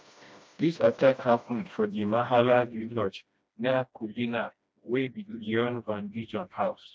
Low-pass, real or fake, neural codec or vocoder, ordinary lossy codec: none; fake; codec, 16 kHz, 1 kbps, FreqCodec, smaller model; none